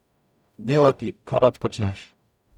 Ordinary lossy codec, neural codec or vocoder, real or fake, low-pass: none; codec, 44.1 kHz, 0.9 kbps, DAC; fake; 19.8 kHz